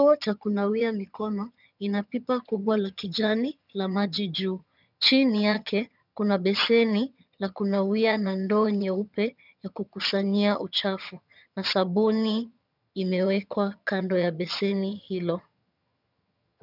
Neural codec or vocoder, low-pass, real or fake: vocoder, 22.05 kHz, 80 mel bands, HiFi-GAN; 5.4 kHz; fake